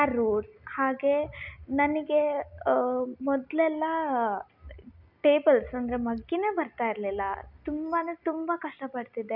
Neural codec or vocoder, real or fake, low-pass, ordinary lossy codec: none; real; 5.4 kHz; none